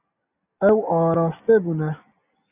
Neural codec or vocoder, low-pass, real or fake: none; 3.6 kHz; real